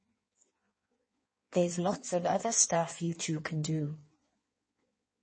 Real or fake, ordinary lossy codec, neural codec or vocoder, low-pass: fake; MP3, 32 kbps; codec, 16 kHz in and 24 kHz out, 1.1 kbps, FireRedTTS-2 codec; 9.9 kHz